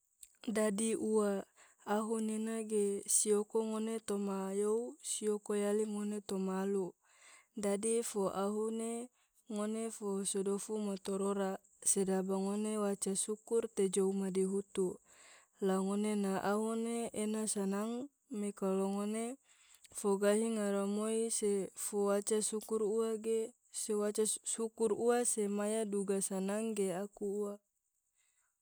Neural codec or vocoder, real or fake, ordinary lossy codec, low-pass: none; real; none; none